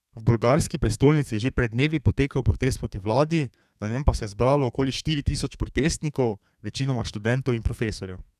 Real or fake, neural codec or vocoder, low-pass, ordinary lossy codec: fake; codec, 32 kHz, 1.9 kbps, SNAC; 14.4 kHz; none